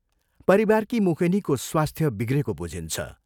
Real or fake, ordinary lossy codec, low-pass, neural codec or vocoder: real; none; 19.8 kHz; none